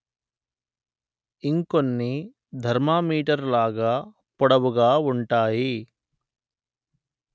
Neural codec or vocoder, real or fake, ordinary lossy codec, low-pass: none; real; none; none